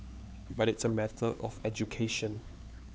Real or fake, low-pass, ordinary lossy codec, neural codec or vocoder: fake; none; none; codec, 16 kHz, 4 kbps, X-Codec, HuBERT features, trained on LibriSpeech